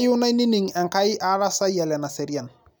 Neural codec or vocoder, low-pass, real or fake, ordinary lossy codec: none; none; real; none